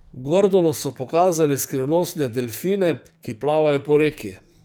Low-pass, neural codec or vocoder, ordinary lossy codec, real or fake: none; codec, 44.1 kHz, 2.6 kbps, SNAC; none; fake